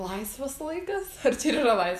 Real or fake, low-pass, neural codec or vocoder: fake; 14.4 kHz; vocoder, 48 kHz, 128 mel bands, Vocos